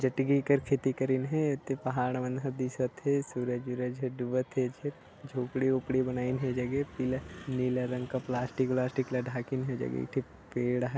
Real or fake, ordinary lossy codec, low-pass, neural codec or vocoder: real; none; none; none